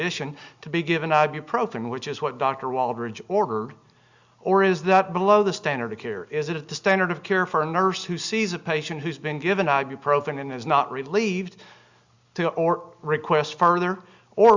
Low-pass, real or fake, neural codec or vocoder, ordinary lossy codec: 7.2 kHz; real; none; Opus, 64 kbps